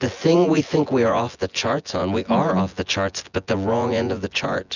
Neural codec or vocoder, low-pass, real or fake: vocoder, 24 kHz, 100 mel bands, Vocos; 7.2 kHz; fake